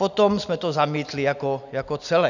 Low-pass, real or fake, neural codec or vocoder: 7.2 kHz; real; none